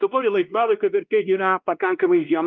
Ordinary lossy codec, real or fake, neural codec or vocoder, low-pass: Opus, 24 kbps; fake; codec, 16 kHz, 1 kbps, X-Codec, WavLM features, trained on Multilingual LibriSpeech; 7.2 kHz